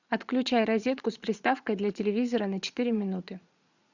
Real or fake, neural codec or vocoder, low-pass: fake; vocoder, 44.1 kHz, 80 mel bands, Vocos; 7.2 kHz